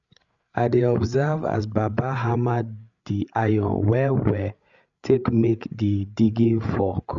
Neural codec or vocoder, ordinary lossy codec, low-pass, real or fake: codec, 16 kHz, 8 kbps, FreqCodec, larger model; MP3, 96 kbps; 7.2 kHz; fake